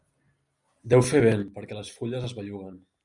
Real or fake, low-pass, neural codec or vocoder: real; 10.8 kHz; none